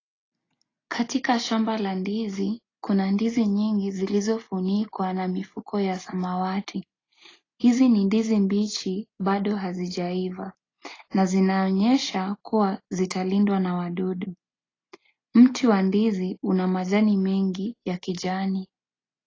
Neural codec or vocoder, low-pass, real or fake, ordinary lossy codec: none; 7.2 kHz; real; AAC, 32 kbps